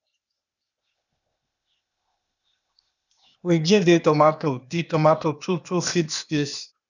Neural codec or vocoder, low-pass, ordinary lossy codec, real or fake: codec, 16 kHz, 0.8 kbps, ZipCodec; 7.2 kHz; none; fake